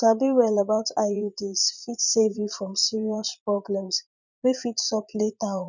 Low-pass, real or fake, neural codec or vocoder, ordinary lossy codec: 7.2 kHz; fake; vocoder, 44.1 kHz, 80 mel bands, Vocos; none